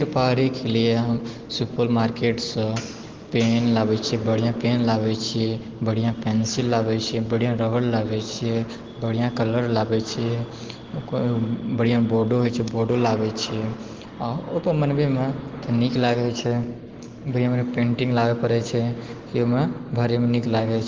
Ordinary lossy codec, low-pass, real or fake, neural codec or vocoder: Opus, 16 kbps; 7.2 kHz; real; none